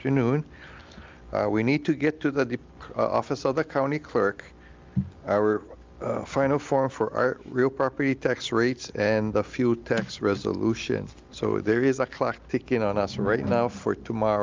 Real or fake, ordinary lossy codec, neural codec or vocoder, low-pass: real; Opus, 24 kbps; none; 7.2 kHz